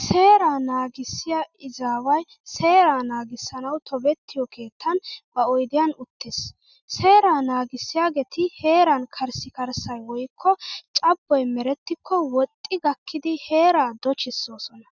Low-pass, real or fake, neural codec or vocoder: 7.2 kHz; real; none